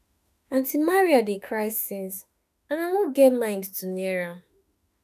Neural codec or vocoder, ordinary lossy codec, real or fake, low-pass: autoencoder, 48 kHz, 32 numbers a frame, DAC-VAE, trained on Japanese speech; none; fake; 14.4 kHz